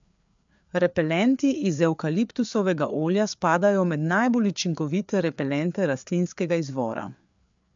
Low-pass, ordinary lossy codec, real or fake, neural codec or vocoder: 7.2 kHz; MP3, 64 kbps; fake; codec, 16 kHz, 4 kbps, FreqCodec, larger model